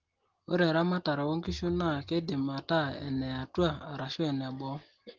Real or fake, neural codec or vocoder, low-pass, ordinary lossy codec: real; none; 7.2 kHz; Opus, 16 kbps